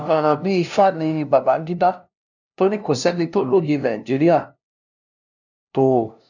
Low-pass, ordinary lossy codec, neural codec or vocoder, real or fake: 7.2 kHz; none; codec, 16 kHz, 0.5 kbps, FunCodec, trained on LibriTTS, 25 frames a second; fake